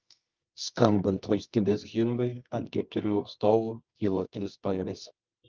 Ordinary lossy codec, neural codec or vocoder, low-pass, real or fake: Opus, 24 kbps; codec, 24 kHz, 0.9 kbps, WavTokenizer, medium music audio release; 7.2 kHz; fake